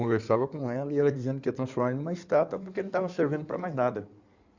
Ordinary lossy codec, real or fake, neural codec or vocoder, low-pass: Opus, 64 kbps; fake; codec, 16 kHz in and 24 kHz out, 2.2 kbps, FireRedTTS-2 codec; 7.2 kHz